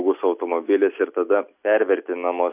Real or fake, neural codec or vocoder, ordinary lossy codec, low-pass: real; none; AAC, 32 kbps; 3.6 kHz